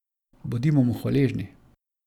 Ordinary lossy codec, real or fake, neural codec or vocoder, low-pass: none; real; none; 19.8 kHz